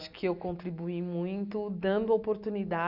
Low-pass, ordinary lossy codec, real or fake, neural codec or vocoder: 5.4 kHz; none; real; none